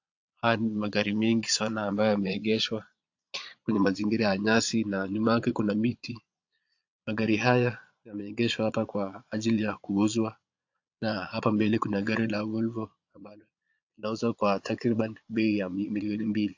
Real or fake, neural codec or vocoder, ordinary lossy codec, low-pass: fake; vocoder, 22.05 kHz, 80 mel bands, Vocos; AAC, 48 kbps; 7.2 kHz